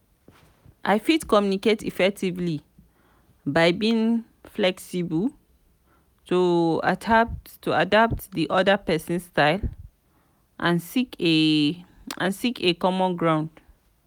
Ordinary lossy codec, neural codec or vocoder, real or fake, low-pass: none; none; real; 19.8 kHz